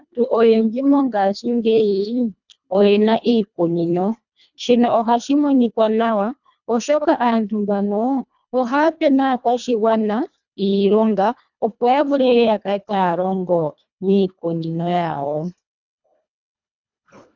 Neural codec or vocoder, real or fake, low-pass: codec, 24 kHz, 1.5 kbps, HILCodec; fake; 7.2 kHz